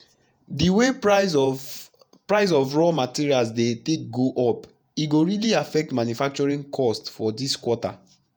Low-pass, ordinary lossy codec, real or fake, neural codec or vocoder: none; none; fake; vocoder, 48 kHz, 128 mel bands, Vocos